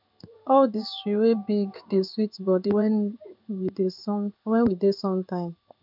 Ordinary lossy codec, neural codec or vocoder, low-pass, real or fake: none; codec, 16 kHz in and 24 kHz out, 1 kbps, XY-Tokenizer; 5.4 kHz; fake